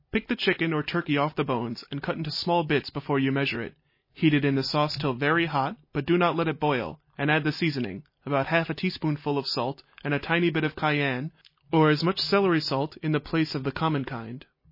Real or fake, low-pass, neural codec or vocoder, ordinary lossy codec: real; 5.4 kHz; none; MP3, 24 kbps